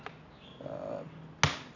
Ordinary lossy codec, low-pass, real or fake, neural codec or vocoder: none; 7.2 kHz; real; none